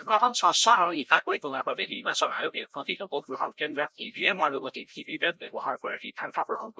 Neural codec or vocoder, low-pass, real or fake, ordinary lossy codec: codec, 16 kHz, 0.5 kbps, FreqCodec, larger model; none; fake; none